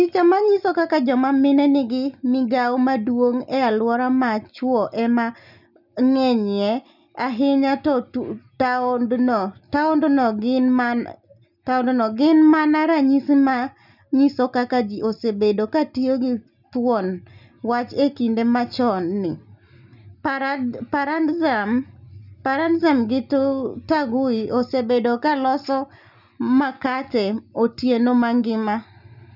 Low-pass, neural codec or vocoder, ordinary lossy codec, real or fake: 5.4 kHz; none; none; real